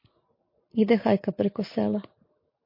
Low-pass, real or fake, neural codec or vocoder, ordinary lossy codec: 5.4 kHz; real; none; MP3, 32 kbps